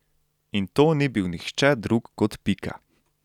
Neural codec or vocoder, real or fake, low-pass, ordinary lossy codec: none; real; 19.8 kHz; none